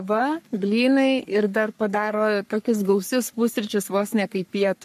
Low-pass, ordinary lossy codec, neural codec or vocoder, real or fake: 14.4 kHz; MP3, 64 kbps; codec, 44.1 kHz, 3.4 kbps, Pupu-Codec; fake